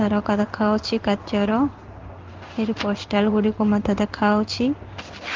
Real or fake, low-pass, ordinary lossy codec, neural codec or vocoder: fake; 7.2 kHz; Opus, 32 kbps; codec, 16 kHz in and 24 kHz out, 1 kbps, XY-Tokenizer